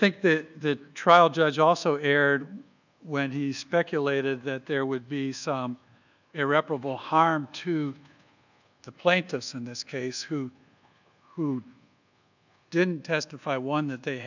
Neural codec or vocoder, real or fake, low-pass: codec, 24 kHz, 1.2 kbps, DualCodec; fake; 7.2 kHz